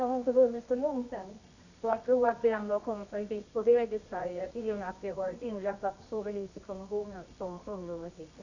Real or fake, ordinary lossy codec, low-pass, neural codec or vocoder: fake; none; 7.2 kHz; codec, 24 kHz, 0.9 kbps, WavTokenizer, medium music audio release